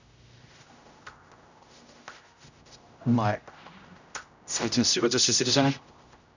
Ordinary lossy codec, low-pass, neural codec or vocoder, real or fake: none; 7.2 kHz; codec, 16 kHz, 0.5 kbps, X-Codec, HuBERT features, trained on general audio; fake